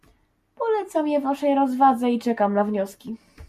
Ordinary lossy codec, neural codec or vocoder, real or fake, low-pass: MP3, 64 kbps; none; real; 14.4 kHz